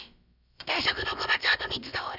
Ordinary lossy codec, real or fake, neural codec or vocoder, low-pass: none; fake; codec, 16 kHz, about 1 kbps, DyCAST, with the encoder's durations; 5.4 kHz